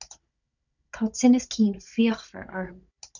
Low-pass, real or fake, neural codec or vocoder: 7.2 kHz; fake; codec, 16 kHz, 16 kbps, FunCodec, trained on Chinese and English, 50 frames a second